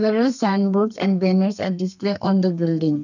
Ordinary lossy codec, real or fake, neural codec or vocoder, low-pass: none; fake; codec, 32 kHz, 1.9 kbps, SNAC; 7.2 kHz